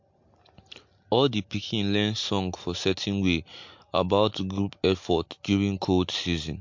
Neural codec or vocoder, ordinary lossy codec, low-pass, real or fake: none; MP3, 48 kbps; 7.2 kHz; real